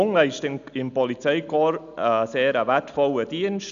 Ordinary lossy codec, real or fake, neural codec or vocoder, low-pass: none; real; none; 7.2 kHz